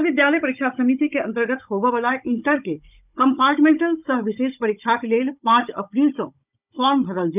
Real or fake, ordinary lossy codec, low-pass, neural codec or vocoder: fake; none; 3.6 kHz; codec, 16 kHz, 16 kbps, FunCodec, trained on Chinese and English, 50 frames a second